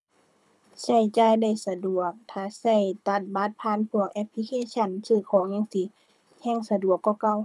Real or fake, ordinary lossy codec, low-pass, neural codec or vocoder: fake; none; none; codec, 24 kHz, 6 kbps, HILCodec